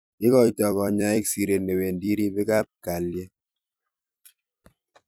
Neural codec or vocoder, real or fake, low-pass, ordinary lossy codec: vocoder, 44.1 kHz, 128 mel bands every 256 samples, BigVGAN v2; fake; none; none